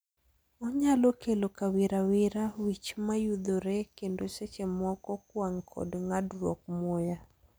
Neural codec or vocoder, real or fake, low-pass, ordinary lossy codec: none; real; none; none